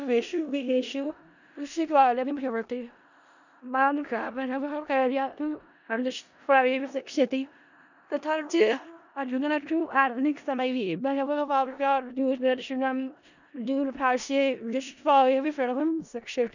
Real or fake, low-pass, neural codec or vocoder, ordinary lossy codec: fake; 7.2 kHz; codec, 16 kHz in and 24 kHz out, 0.4 kbps, LongCat-Audio-Codec, four codebook decoder; none